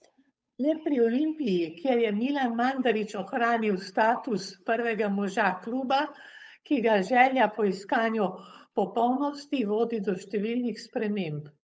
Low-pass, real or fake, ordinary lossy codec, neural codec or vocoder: none; fake; none; codec, 16 kHz, 8 kbps, FunCodec, trained on Chinese and English, 25 frames a second